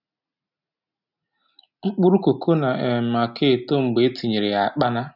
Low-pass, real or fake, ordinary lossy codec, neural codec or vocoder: 5.4 kHz; real; none; none